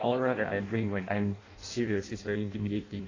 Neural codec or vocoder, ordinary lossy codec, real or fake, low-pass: codec, 16 kHz in and 24 kHz out, 0.6 kbps, FireRedTTS-2 codec; AAC, 32 kbps; fake; 7.2 kHz